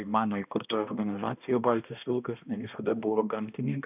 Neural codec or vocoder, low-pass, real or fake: codec, 16 kHz, 1 kbps, X-Codec, HuBERT features, trained on balanced general audio; 3.6 kHz; fake